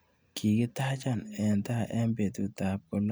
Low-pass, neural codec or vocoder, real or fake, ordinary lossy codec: none; none; real; none